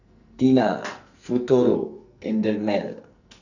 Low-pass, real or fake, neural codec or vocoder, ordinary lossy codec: 7.2 kHz; fake; codec, 44.1 kHz, 2.6 kbps, SNAC; none